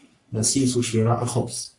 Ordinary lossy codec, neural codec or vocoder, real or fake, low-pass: AAC, 64 kbps; codec, 44.1 kHz, 3.4 kbps, Pupu-Codec; fake; 10.8 kHz